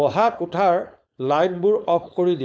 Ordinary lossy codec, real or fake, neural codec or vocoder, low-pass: none; fake; codec, 16 kHz, 4.8 kbps, FACodec; none